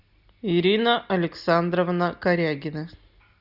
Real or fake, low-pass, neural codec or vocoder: real; 5.4 kHz; none